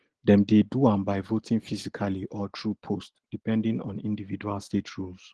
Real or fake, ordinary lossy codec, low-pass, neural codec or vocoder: real; Opus, 16 kbps; 10.8 kHz; none